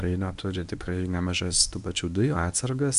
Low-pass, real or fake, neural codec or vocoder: 10.8 kHz; fake; codec, 24 kHz, 0.9 kbps, WavTokenizer, medium speech release version 1